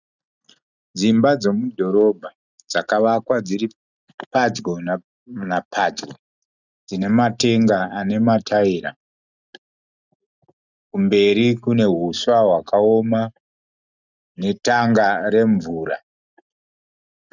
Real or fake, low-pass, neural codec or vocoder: real; 7.2 kHz; none